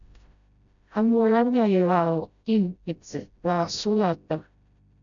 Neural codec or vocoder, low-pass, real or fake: codec, 16 kHz, 0.5 kbps, FreqCodec, smaller model; 7.2 kHz; fake